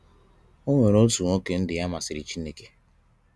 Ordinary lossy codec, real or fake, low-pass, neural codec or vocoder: none; real; none; none